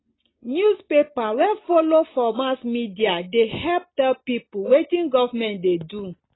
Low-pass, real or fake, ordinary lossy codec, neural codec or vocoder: 7.2 kHz; real; AAC, 16 kbps; none